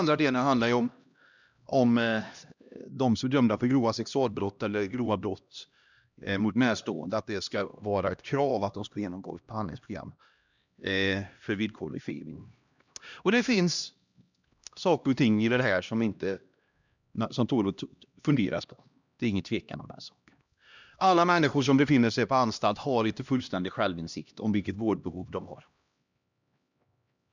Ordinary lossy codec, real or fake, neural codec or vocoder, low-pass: none; fake; codec, 16 kHz, 1 kbps, X-Codec, HuBERT features, trained on LibriSpeech; 7.2 kHz